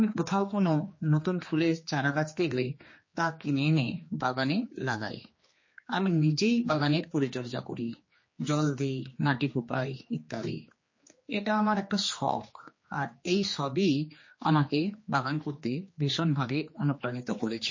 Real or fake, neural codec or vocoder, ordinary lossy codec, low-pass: fake; codec, 16 kHz, 2 kbps, X-Codec, HuBERT features, trained on general audio; MP3, 32 kbps; 7.2 kHz